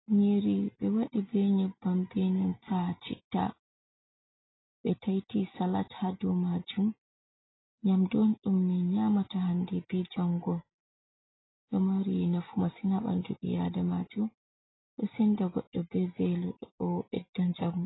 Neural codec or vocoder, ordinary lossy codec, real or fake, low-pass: none; AAC, 16 kbps; real; 7.2 kHz